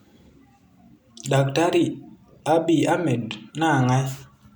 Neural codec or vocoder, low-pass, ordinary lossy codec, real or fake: none; none; none; real